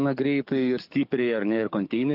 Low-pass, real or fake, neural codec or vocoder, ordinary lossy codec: 5.4 kHz; fake; codec, 44.1 kHz, 7.8 kbps, DAC; AAC, 48 kbps